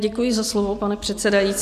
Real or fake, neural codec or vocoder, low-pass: real; none; 14.4 kHz